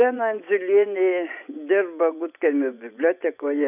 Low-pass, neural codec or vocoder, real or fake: 3.6 kHz; vocoder, 24 kHz, 100 mel bands, Vocos; fake